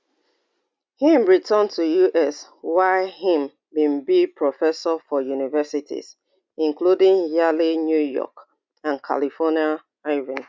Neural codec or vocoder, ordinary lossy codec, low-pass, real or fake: none; none; none; real